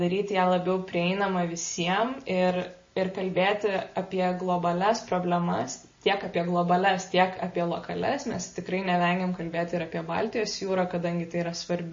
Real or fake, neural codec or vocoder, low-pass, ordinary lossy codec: real; none; 7.2 kHz; MP3, 32 kbps